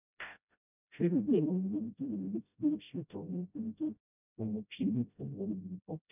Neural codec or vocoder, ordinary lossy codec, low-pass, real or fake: codec, 16 kHz, 0.5 kbps, FreqCodec, smaller model; none; 3.6 kHz; fake